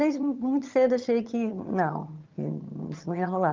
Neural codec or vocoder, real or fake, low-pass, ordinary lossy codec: vocoder, 22.05 kHz, 80 mel bands, HiFi-GAN; fake; 7.2 kHz; Opus, 32 kbps